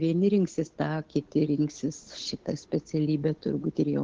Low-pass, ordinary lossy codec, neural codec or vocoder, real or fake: 7.2 kHz; Opus, 16 kbps; codec, 16 kHz, 8 kbps, FunCodec, trained on Chinese and English, 25 frames a second; fake